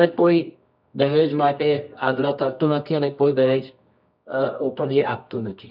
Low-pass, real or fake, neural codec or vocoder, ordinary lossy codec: 5.4 kHz; fake; codec, 24 kHz, 0.9 kbps, WavTokenizer, medium music audio release; none